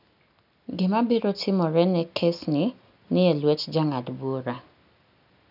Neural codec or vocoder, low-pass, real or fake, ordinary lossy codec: none; 5.4 kHz; real; none